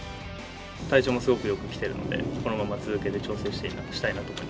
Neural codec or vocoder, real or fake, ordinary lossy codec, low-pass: none; real; none; none